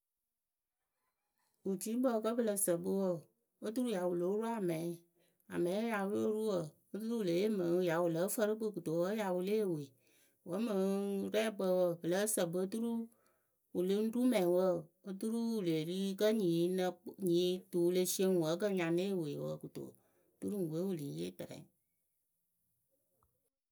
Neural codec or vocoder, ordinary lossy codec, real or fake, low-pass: none; none; real; none